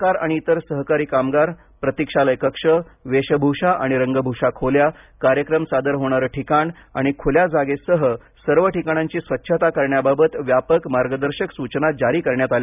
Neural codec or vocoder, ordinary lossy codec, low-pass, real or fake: none; none; 3.6 kHz; real